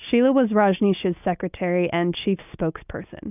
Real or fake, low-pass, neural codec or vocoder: real; 3.6 kHz; none